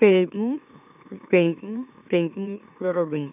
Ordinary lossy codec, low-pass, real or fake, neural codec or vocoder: none; 3.6 kHz; fake; autoencoder, 44.1 kHz, a latent of 192 numbers a frame, MeloTTS